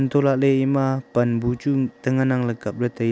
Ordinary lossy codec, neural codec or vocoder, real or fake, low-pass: none; none; real; none